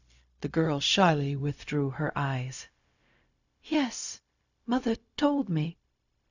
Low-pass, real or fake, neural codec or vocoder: 7.2 kHz; fake; codec, 16 kHz, 0.4 kbps, LongCat-Audio-Codec